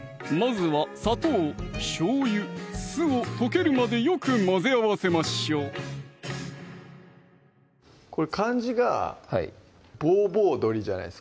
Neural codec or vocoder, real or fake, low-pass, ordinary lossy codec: none; real; none; none